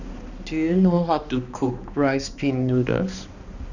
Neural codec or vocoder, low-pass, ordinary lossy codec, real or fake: codec, 16 kHz, 2 kbps, X-Codec, HuBERT features, trained on balanced general audio; 7.2 kHz; none; fake